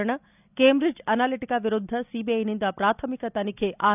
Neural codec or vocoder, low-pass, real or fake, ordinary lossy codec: none; 3.6 kHz; real; none